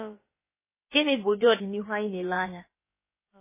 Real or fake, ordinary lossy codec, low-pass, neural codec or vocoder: fake; MP3, 16 kbps; 3.6 kHz; codec, 16 kHz, about 1 kbps, DyCAST, with the encoder's durations